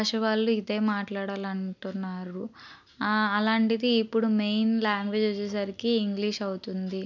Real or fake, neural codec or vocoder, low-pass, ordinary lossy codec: real; none; 7.2 kHz; none